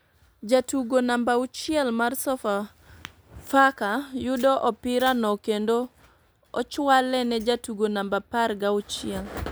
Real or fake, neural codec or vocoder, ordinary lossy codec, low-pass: real; none; none; none